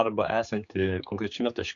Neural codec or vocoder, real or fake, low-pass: codec, 16 kHz, 4 kbps, X-Codec, HuBERT features, trained on general audio; fake; 7.2 kHz